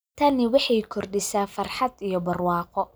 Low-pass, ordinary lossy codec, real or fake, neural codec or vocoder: none; none; real; none